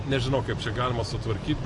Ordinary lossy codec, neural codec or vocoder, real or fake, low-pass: AAC, 48 kbps; none; real; 10.8 kHz